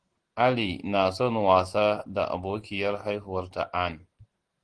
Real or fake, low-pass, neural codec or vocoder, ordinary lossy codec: real; 9.9 kHz; none; Opus, 16 kbps